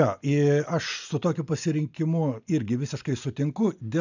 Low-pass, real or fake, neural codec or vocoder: 7.2 kHz; real; none